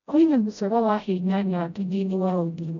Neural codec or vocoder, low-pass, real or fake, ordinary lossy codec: codec, 16 kHz, 0.5 kbps, FreqCodec, smaller model; 7.2 kHz; fake; none